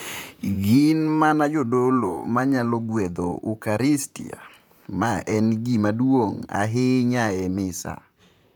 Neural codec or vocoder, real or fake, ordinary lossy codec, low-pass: vocoder, 44.1 kHz, 128 mel bands, Pupu-Vocoder; fake; none; none